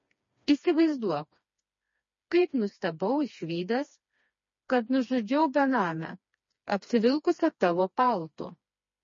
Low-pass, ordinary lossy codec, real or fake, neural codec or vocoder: 7.2 kHz; MP3, 32 kbps; fake; codec, 16 kHz, 2 kbps, FreqCodec, smaller model